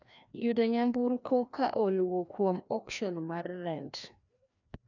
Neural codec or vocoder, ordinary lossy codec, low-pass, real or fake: codec, 16 kHz, 1 kbps, FreqCodec, larger model; none; 7.2 kHz; fake